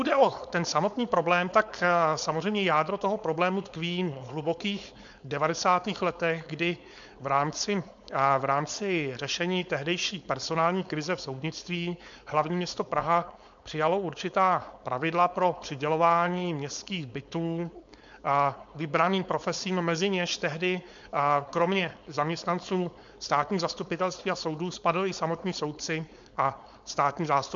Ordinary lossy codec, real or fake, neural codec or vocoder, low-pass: MP3, 64 kbps; fake; codec, 16 kHz, 4.8 kbps, FACodec; 7.2 kHz